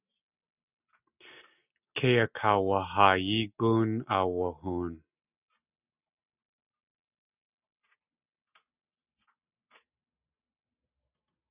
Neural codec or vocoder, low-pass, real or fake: none; 3.6 kHz; real